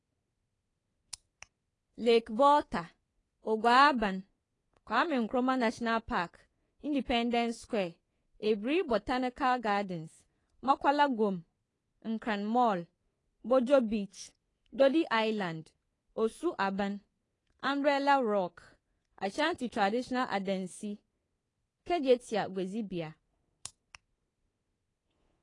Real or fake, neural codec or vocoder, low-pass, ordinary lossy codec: fake; codec, 24 kHz, 3.1 kbps, DualCodec; 10.8 kHz; AAC, 32 kbps